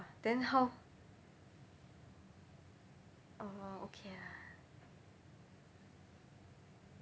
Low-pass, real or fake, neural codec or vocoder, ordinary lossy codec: none; real; none; none